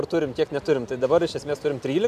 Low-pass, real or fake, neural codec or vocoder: 14.4 kHz; real; none